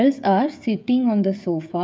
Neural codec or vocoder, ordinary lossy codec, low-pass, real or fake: codec, 16 kHz, 16 kbps, FreqCodec, smaller model; none; none; fake